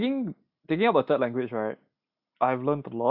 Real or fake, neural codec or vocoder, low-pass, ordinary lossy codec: real; none; 5.4 kHz; none